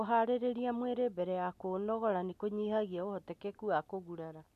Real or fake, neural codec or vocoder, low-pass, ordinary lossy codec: real; none; 14.4 kHz; none